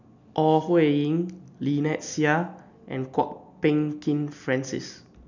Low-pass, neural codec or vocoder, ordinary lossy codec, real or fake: 7.2 kHz; none; none; real